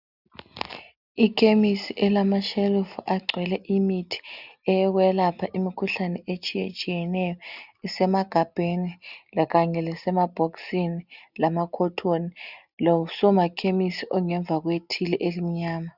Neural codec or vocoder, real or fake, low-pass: none; real; 5.4 kHz